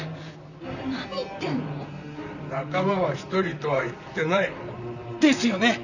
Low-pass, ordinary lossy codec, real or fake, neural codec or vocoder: 7.2 kHz; none; fake; vocoder, 44.1 kHz, 128 mel bands, Pupu-Vocoder